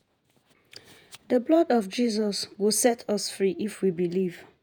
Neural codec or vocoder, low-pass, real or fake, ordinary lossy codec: vocoder, 48 kHz, 128 mel bands, Vocos; none; fake; none